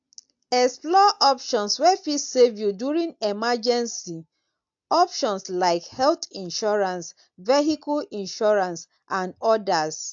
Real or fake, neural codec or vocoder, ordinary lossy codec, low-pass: real; none; AAC, 64 kbps; 7.2 kHz